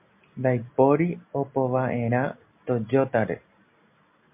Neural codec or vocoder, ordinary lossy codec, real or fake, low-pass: none; MP3, 32 kbps; real; 3.6 kHz